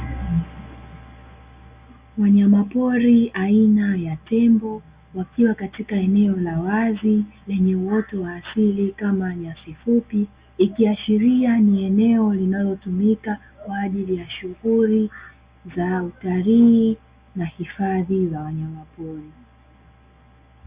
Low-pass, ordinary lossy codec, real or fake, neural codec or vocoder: 3.6 kHz; Opus, 64 kbps; real; none